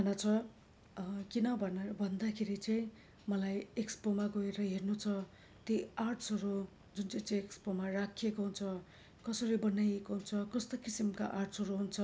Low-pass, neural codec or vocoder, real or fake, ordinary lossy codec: none; none; real; none